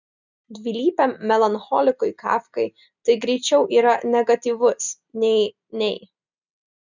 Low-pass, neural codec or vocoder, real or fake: 7.2 kHz; none; real